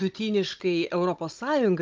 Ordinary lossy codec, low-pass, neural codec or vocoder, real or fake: Opus, 32 kbps; 7.2 kHz; codec, 16 kHz, 16 kbps, FunCodec, trained on Chinese and English, 50 frames a second; fake